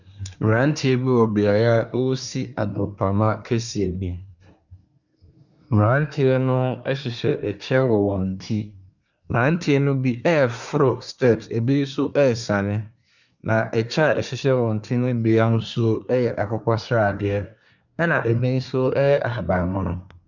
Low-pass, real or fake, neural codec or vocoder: 7.2 kHz; fake; codec, 24 kHz, 1 kbps, SNAC